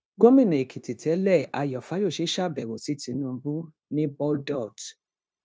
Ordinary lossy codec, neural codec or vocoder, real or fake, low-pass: none; codec, 16 kHz, 0.9 kbps, LongCat-Audio-Codec; fake; none